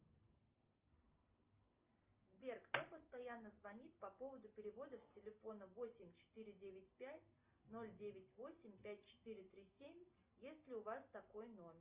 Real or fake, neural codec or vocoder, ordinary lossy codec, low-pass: real; none; Opus, 24 kbps; 3.6 kHz